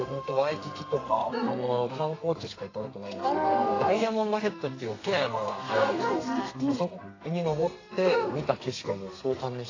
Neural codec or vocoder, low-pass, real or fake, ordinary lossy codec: codec, 32 kHz, 1.9 kbps, SNAC; 7.2 kHz; fake; AAC, 32 kbps